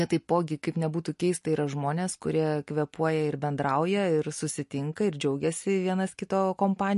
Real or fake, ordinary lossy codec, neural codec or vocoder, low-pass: real; MP3, 48 kbps; none; 14.4 kHz